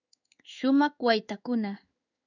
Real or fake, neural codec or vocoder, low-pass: fake; codec, 16 kHz in and 24 kHz out, 1 kbps, XY-Tokenizer; 7.2 kHz